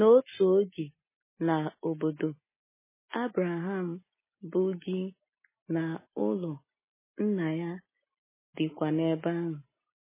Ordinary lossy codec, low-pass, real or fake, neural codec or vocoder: MP3, 16 kbps; 3.6 kHz; real; none